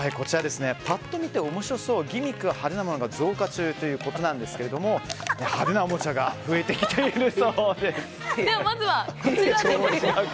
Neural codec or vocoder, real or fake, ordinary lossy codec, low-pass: none; real; none; none